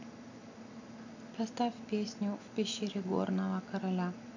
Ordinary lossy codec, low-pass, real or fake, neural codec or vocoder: none; 7.2 kHz; real; none